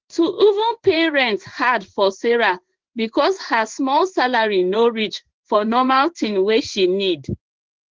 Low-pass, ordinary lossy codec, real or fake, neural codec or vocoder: 7.2 kHz; Opus, 16 kbps; fake; codec, 16 kHz in and 24 kHz out, 1 kbps, XY-Tokenizer